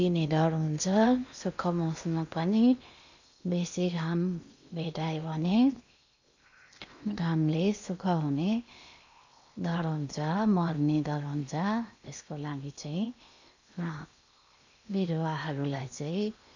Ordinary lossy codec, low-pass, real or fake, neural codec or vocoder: none; 7.2 kHz; fake; codec, 16 kHz in and 24 kHz out, 0.8 kbps, FocalCodec, streaming, 65536 codes